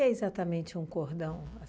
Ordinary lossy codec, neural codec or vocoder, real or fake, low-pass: none; none; real; none